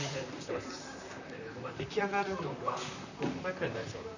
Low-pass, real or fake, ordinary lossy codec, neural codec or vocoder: 7.2 kHz; fake; none; vocoder, 44.1 kHz, 128 mel bands, Pupu-Vocoder